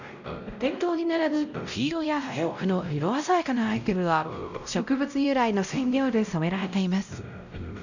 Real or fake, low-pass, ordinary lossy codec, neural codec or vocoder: fake; 7.2 kHz; none; codec, 16 kHz, 0.5 kbps, X-Codec, WavLM features, trained on Multilingual LibriSpeech